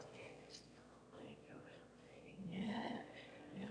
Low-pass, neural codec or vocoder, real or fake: 9.9 kHz; autoencoder, 22.05 kHz, a latent of 192 numbers a frame, VITS, trained on one speaker; fake